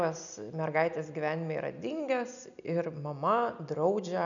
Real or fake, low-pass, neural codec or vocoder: real; 7.2 kHz; none